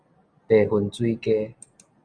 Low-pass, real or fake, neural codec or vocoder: 9.9 kHz; real; none